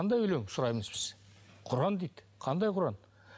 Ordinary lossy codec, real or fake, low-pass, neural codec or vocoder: none; real; none; none